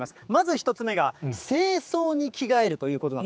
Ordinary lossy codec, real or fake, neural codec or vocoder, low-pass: none; fake; codec, 16 kHz, 4 kbps, X-Codec, HuBERT features, trained on general audio; none